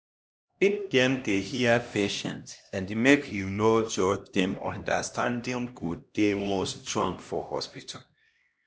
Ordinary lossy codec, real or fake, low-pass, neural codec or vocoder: none; fake; none; codec, 16 kHz, 1 kbps, X-Codec, HuBERT features, trained on LibriSpeech